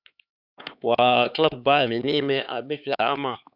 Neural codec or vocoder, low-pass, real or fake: codec, 16 kHz, 4 kbps, X-Codec, HuBERT features, trained on LibriSpeech; 5.4 kHz; fake